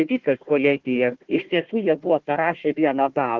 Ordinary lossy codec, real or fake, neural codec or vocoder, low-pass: Opus, 16 kbps; fake; codec, 16 kHz, 1 kbps, FunCodec, trained on Chinese and English, 50 frames a second; 7.2 kHz